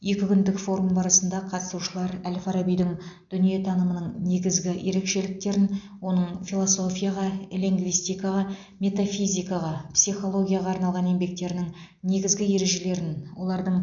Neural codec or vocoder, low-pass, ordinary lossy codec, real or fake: none; 7.2 kHz; none; real